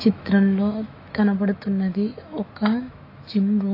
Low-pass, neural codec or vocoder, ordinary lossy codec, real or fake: 5.4 kHz; autoencoder, 48 kHz, 128 numbers a frame, DAC-VAE, trained on Japanese speech; AAC, 32 kbps; fake